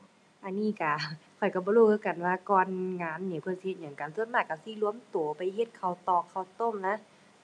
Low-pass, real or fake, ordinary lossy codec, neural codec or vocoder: none; real; none; none